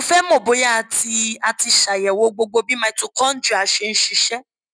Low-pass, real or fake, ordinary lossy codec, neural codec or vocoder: 9.9 kHz; real; none; none